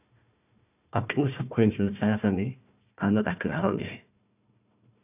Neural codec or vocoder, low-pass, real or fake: codec, 16 kHz, 1 kbps, FunCodec, trained on Chinese and English, 50 frames a second; 3.6 kHz; fake